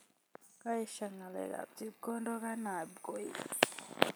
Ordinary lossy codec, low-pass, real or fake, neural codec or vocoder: none; none; real; none